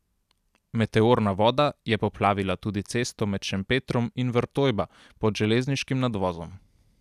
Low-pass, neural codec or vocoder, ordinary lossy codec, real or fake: 14.4 kHz; none; none; real